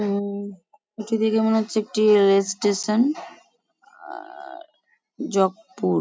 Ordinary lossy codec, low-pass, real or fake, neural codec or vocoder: none; none; real; none